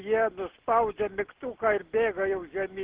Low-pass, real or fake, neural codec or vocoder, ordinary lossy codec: 3.6 kHz; real; none; Opus, 16 kbps